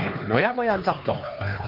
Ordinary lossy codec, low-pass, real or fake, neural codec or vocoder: Opus, 32 kbps; 5.4 kHz; fake; codec, 16 kHz, 2 kbps, X-Codec, HuBERT features, trained on LibriSpeech